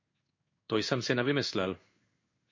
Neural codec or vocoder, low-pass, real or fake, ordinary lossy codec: codec, 16 kHz in and 24 kHz out, 1 kbps, XY-Tokenizer; 7.2 kHz; fake; MP3, 48 kbps